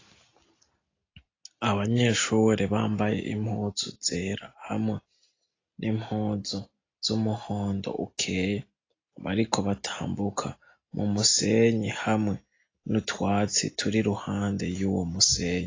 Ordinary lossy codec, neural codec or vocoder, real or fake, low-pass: AAC, 32 kbps; none; real; 7.2 kHz